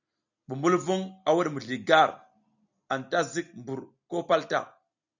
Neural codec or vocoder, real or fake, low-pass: none; real; 7.2 kHz